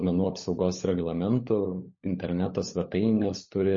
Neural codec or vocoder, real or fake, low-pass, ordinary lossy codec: codec, 16 kHz, 16 kbps, FunCodec, trained on LibriTTS, 50 frames a second; fake; 7.2 kHz; MP3, 32 kbps